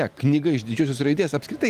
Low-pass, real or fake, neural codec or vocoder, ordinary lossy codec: 14.4 kHz; real; none; Opus, 24 kbps